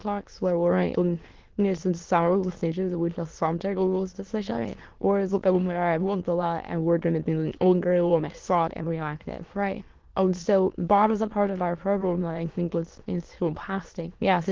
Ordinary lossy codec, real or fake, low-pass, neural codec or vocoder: Opus, 16 kbps; fake; 7.2 kHz; autoencoder, 22.05 kHz, a latent of 192 numbers a frame, VITS, trained on many speakers